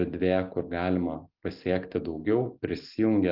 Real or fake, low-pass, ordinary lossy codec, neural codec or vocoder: real; 5.4 kHz; Opus, 24 kbps; none